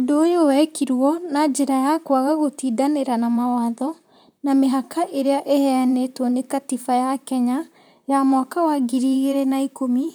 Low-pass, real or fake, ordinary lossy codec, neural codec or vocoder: none; fake; none; vocoder, 44.1 kHz, 128 mel bands, Pupu-Vocoder